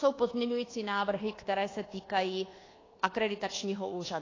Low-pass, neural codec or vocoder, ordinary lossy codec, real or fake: 7.2 kHz; codec, 24 kHz, 1.2 kbps, DualCodec; AAC, 32 kbps; fake